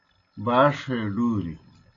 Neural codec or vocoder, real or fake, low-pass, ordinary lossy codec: none; real; 7.2 kHz; AAC, 32 kbps